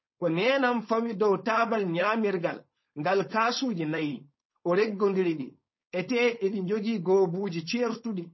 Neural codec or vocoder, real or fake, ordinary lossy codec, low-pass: codec, 16 kHz, 4.8 kbps, FACodec; fake; MP3, 24 kbps; 7.2 kHz